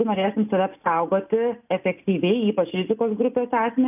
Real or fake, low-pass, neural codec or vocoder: real; 3.6 kHz; none